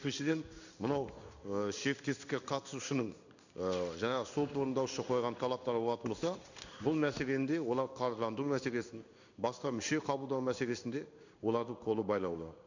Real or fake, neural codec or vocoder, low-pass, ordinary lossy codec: fake; codec, 16 kHz in and 24 kHz out, 1 kbps, XY-Tokenizer; 7.2 kHz; none